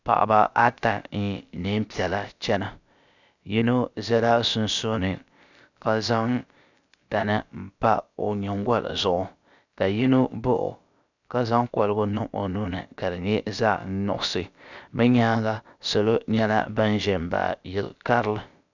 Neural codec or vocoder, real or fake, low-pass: codec, 16 kHz, about 1 kbps, DyCAST, with the encoder's durations; fake; 7.2 kHz